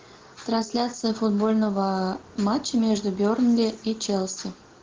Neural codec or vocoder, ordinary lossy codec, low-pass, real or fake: none; Opus, 16 kbps; 7.2 kHz; real